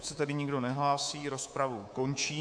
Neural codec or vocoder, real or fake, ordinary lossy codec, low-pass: codec, 24 kHz, 3.1 kbps, DualCodec; fake; AAC, 64 kbps; 9.9 kHz